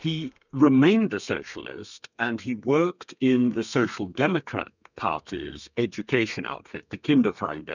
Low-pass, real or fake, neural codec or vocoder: 7.2 kHz; fake; codec, 32 kHz, 1.9 kbps, SNAC